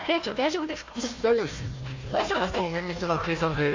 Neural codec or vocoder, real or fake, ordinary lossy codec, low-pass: codec, 16 kHz, 1 kbps, FunCodec, trained on Chinese and English, 50 frames a second; fake; AAC, 48 kbps; 7.2 kHz